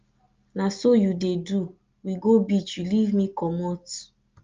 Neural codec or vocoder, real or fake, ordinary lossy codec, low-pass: none; real; Opus, 24 kbps; 7.2 kHz